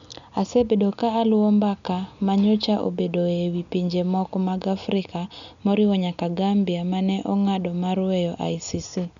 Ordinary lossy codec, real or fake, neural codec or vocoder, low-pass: none; real; none; 7.2 kHz